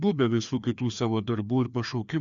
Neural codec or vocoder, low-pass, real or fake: codec, 16 kHz, 2 kbps, FreqCodec, larger model; 7.2 kHz; fake